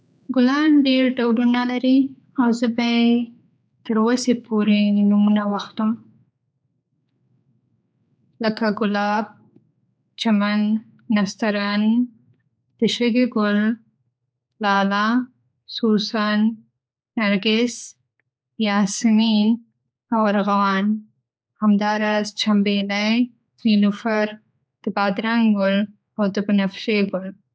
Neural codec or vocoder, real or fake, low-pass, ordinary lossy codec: codec, 16 kHz, 4 kbps, X-Codec, HuBERT features, trained on general audio; fake; none; none